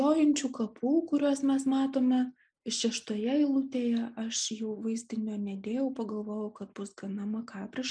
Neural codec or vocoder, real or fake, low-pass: none; real; 9.9 kHz